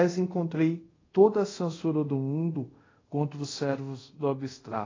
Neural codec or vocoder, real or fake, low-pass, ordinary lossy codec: codec, 24 kHz, 0.5 kbps, DualCodec; fake; 7.2 kHz; AAC, 32 kbps